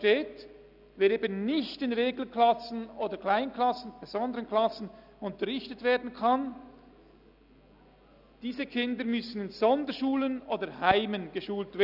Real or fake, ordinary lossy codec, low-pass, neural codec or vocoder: real; none; 5.4 kHz; none